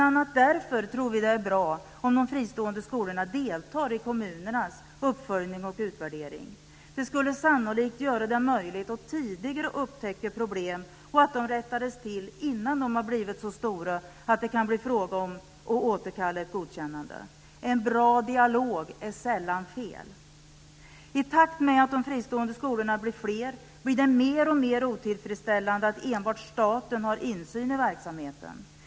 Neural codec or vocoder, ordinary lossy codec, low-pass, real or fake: none; none; none; real